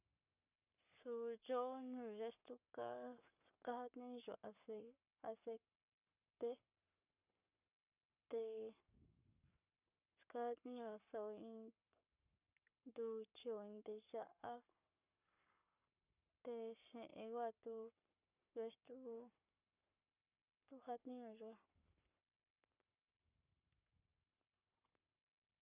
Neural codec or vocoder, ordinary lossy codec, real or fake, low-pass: codec, 44.1 kHz, 7.8 kbps, Pupu-Codec; none; fake; 3.6 kHz